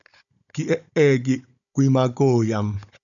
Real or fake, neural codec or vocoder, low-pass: fake; codec, 16 kHz, 16 kbps, FunCodec, trained on Chinese and English, 50 frames a second; 7.2 kHz